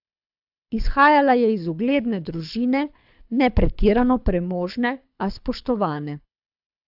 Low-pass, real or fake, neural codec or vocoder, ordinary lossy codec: 5.4 kHz; fake; codec, 24 kHz, 6 kbps, HILCodec; AAC, 48 kbps